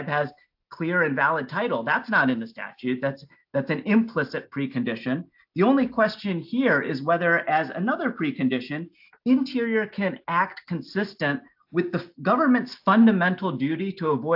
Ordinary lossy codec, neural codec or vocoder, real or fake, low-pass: AAC, 48 kbps; none; real; 5.4 kHz